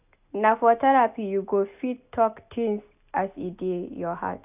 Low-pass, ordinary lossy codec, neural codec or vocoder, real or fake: 3.6 kHz; AAC, 32 kbps; none; real